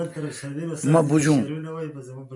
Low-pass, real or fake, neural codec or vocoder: 10.8 kHz; real; none